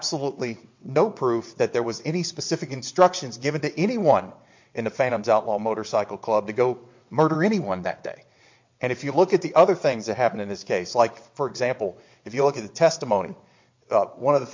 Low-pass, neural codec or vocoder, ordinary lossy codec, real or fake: 7.2 kHz; vocoder, 22.05 kHz, 80 mel bands, WaveNeXt; MP3, 48 kbps; fake